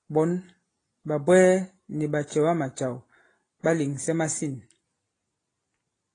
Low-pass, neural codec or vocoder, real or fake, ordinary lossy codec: 9.9 kHz; none; real; AAC, 32 kbps